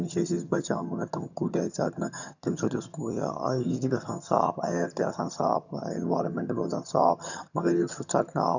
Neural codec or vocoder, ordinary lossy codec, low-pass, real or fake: vocoder, 22.05 kHz, 80 mel bands, HiFi-GAN; none; 7.2 kHz; fake